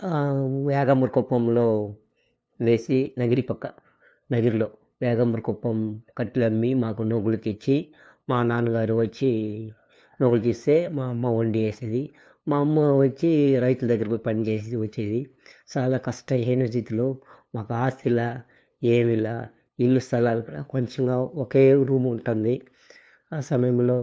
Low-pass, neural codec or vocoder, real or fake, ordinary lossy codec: none; codec, 16 kHz, 2 kbps, FunCodec, trained on LibriTTS, 25 frames a second; fake; none